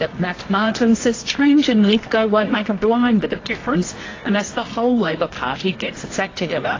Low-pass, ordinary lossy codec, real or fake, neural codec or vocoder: 7.2 kHz; AAC, 32 kbps; fake; codec, 24 kHz, 0.9 kbps, WavTokenizer, medium music audio release